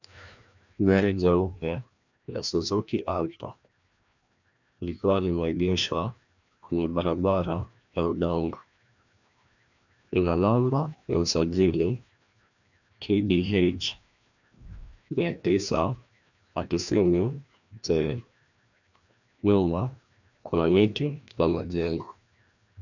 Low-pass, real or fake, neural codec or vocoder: 7.2 kHz; fake; codec, 16 kHz, 1 kbps, FreqCodec, larger model